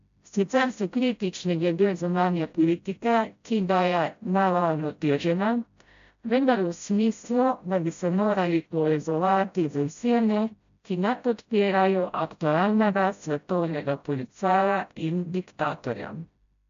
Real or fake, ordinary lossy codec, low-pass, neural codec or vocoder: fake; MP3, 64 kbps; 7.2 kHz; codec, 16 kHz, 0.5 kbps, FreqCodec, smaller model